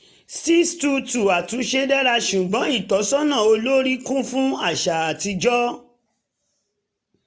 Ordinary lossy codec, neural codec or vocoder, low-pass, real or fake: none; none; none; real